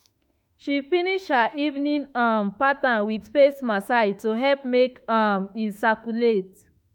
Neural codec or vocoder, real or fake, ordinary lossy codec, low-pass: autoencoder, 48 kHz, 32 numbers a frame, DAC-VAE, trained on Japanese speech; fake; none; 19.8 kHz